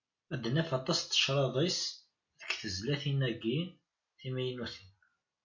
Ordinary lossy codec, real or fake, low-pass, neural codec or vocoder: MP3, 48 kbps; real; 7.2 kHz; none